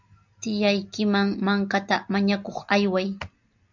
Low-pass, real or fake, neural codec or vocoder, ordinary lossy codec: 7.2 kHz; real; none; MP3, 64 kbps